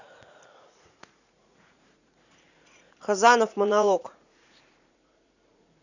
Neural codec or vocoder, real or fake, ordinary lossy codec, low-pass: vocoder, 44.1 kHz, 80 mel bands, Vocos; fake; none; 7.2 kHz